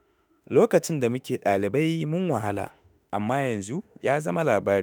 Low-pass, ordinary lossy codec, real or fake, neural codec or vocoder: none; none; fake; autoencoder, 48 kHz, 32 numbers a frame, DAC-VAE, trained on Japanese speech